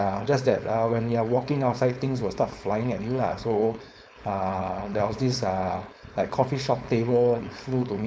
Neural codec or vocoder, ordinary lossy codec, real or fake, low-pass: codec, 16 kHz, 4.8 kbps, FACodec; none; fake; none